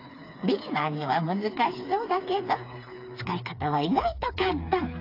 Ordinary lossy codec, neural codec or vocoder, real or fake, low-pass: AAC, 32 kbps; codec, 16 kHz, 4 kbps, FreqCodec, smaller model; fake; 5.4 kHz